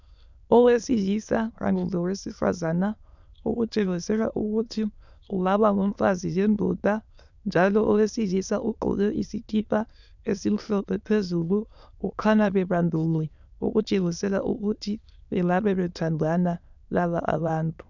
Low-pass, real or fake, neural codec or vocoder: 7.2 kHz; fake; autoencoder, 22.05 kHz, a latent of 192 numbers a frame, VITS, trained on many speakers